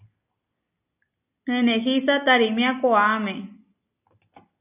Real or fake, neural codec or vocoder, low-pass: real; none; 3.6 kHz